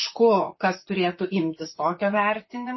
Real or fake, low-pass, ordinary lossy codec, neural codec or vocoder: fake; 7.2 kHz; MP3, 24 kbps; vocoder, 44.1 kHz, 128 mel bands, Pupu-Vocoder